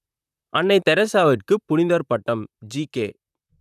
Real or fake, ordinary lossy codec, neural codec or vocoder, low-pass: fake; none; vocoder, 44.1 kHz, 128 mel bands, Pupu-Vocoder; 14.4 kHz